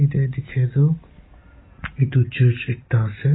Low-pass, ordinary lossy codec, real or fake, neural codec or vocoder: 7.2 kHz; AAC, 16 kbps; real; none